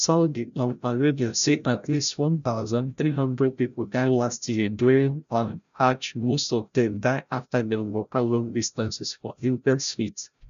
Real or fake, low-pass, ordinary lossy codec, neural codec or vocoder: fake; 7.2 kHz; none; codec, 16 kHz, 0.5 kbps, FreqCodec, larger model